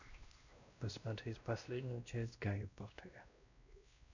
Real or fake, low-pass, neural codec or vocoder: fake; 7.2 kHz; codec, 16 kHz, 1 kbps, X-Codec, WavLM features, trained on Multilingual LibriSpeech